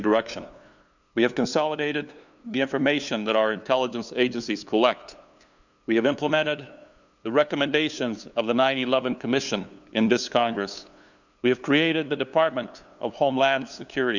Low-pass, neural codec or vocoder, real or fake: 7.2 kHz; codec, 16 kHz, 2 kbps, FunCodec, trained on LibriTTS, 25 frames a second; fake